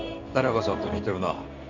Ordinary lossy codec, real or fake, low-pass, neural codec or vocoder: none; fake; 7.2 kHz; codec, 16 kHz in and 24 kHz out, 1 kbps, XY-Tokenizer